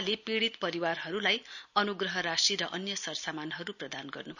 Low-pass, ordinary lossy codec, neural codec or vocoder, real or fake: 7.2 kHz; none; none; real